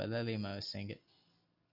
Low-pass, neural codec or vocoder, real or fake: 5.4 kHz; none; real